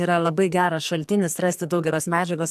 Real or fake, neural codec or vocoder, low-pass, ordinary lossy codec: fake; codec, 44.1 kHz, 2.6 kbps, SNAC; 14.4 kHz; AAC, 96 kbps